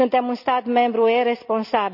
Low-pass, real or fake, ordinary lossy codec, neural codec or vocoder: 5.4 kHz; real; none; none